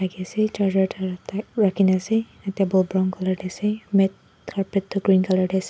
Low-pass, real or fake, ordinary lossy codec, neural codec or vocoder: none; real; none; none